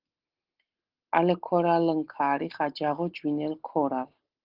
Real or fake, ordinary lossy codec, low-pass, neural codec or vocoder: real; Opus, 16 kbps; 5.4 kHz; none